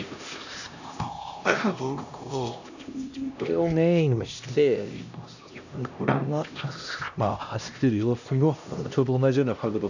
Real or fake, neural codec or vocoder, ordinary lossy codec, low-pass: fake; codec, 16 kHz, 1 kbps, X-Codec, HuBERT features, trained on LibriSpeech; none; 7.2 kHz